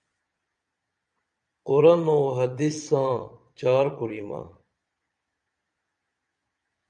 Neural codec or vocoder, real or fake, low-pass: vocoder, 22.05 kHz, 80 mel bands, Vocos; fake; 9.9 kHz